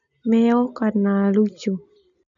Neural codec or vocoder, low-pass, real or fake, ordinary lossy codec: none; 7.2 kHz; real; none